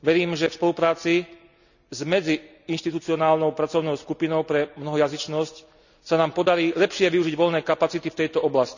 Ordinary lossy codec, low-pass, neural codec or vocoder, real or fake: none; 7.2 kHz; none; real